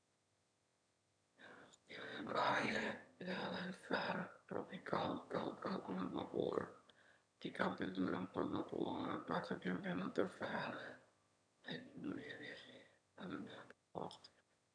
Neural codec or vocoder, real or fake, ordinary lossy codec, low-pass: autoencoder, 22.05 kHz, a latent of 192 numbers a frame, VITS, trained on one speaker; fake; none; 9.9 kHz